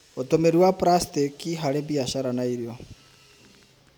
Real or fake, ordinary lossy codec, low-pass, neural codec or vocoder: real; none; none; none